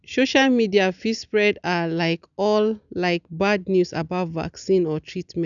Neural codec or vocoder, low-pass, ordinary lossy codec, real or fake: none; 7.2 kHz; none; real